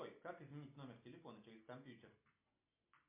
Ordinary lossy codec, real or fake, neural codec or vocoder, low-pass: MP3, 24 kbps; real; none; 3.6 kHz